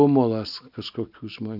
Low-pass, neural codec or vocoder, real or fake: 5.4 kHz; none; real